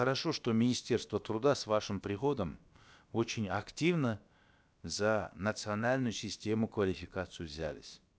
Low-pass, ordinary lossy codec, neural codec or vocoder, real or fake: none; none; codec, 16 kHz, about 1 kbps, DyCAST, with the encoder's durations; fake